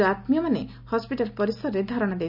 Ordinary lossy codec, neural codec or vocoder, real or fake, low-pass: none; none; real; 5.4 kHz